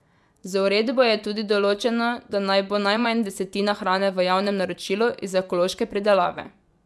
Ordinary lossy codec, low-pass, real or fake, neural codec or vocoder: none; none; fake; vocoder, 24 kHz, 100 mel bands, Vocos